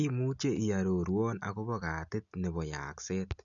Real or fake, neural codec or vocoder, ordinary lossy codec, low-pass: real; none; none; 7.2 kHz